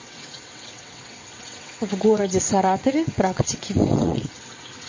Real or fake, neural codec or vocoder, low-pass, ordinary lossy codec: fake; vocoder, 22.05 kHz, 80 mel bands, WaveNeXt; 7.2 kHz; MP3, 32 kbps